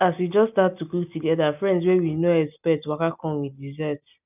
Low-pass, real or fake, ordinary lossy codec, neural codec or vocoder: 3.6 kHz; real; none; none